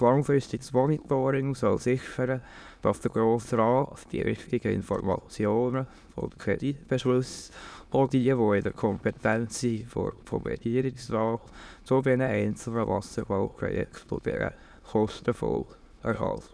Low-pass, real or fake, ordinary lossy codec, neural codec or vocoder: none; fake; none; autoencoder, 22.05 kHz, a latent of 192 numbers a frame, VITS, trained on many speakers